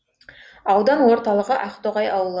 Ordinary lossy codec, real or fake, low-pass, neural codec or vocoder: none; real; none; none